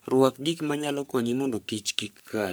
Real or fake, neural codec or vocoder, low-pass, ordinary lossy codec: fake; codec, 44.1 kHz, 3.4 kbps, Pupu-Codec; none; none